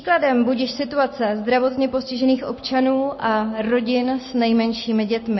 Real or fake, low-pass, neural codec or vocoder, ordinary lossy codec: real; 7.2 kHz; none; MP3, 24 kbps